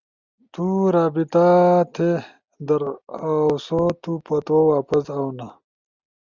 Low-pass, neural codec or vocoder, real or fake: 7.2 kHz; none; real